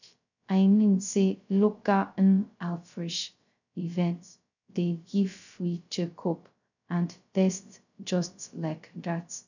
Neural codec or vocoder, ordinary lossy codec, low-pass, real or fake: codec, 16 kHz, 0.2 kbps, FocalCodec; none; 7.2 kHz; fake